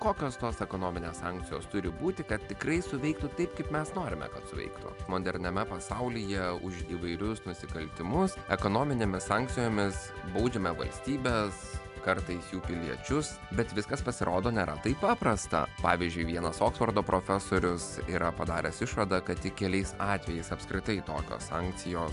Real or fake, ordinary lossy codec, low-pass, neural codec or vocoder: real; MP3, 96 kbps; 10.8 kHz; none